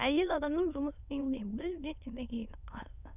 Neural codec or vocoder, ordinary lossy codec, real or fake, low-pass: autoencoder, 22.05 kHz, a latent of 192 numbers a frame, VITS, trained on many speakers; none; fake; 3.6 kHz